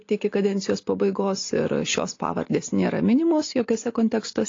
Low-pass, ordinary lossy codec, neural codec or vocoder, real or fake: 7.2 kHz; AAC, 32 kbps; none; real